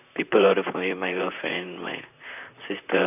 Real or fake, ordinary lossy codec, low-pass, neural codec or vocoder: fake; none; 3.6 kHz; vocoder, 44.1 kHz, 128 mel bands, Pupu-Vocoder